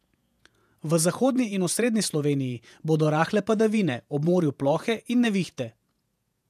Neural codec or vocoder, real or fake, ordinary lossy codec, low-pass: vocoder, 48 kHz, 128 mel bands, Vocos; fake; none; 14.4 kHz